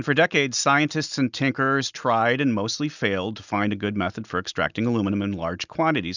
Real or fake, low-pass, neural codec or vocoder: real; 7.2 kHz; none